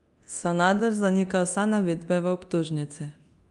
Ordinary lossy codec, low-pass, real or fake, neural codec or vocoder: Opus, 32 kbps; 10.8 kHz; fake; codec, 24 kHz, 0.9 kbps, DualCodec